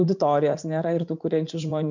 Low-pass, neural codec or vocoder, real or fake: 7.2 kHz; vocoder, 44.1 kHz, 80 mel bands, Vocos; fake